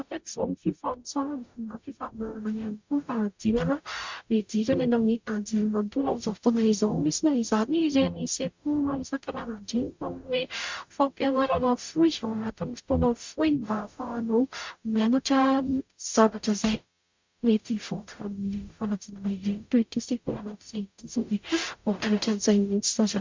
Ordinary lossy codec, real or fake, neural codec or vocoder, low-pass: MP3, 64 kbps; fake; codec, 44.1 kHz, 0.9 kbps, DAC; 7.2 kHz